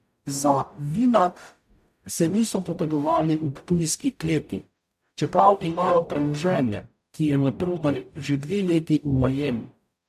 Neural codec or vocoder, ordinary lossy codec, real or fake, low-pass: codec, 44.1 kHz, 0.9 kbps, DAC; AAC, 96 kbps; fake; 14.4 kHz